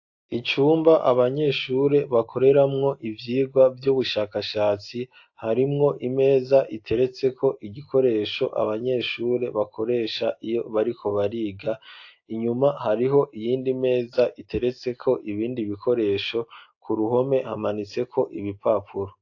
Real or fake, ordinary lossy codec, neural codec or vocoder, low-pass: real; AAC, 48 kbps; none; 7.2 kHz